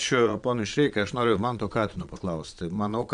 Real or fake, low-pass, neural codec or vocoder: fake; 9.9 kHz; vocoder, 22.05 kHz, 80 mel bands, Vocos